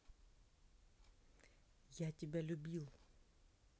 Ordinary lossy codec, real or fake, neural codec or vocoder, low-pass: none; real; none; none